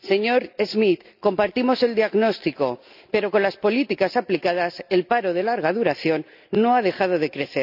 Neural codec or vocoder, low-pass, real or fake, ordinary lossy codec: none; 5.4 kHz; real; none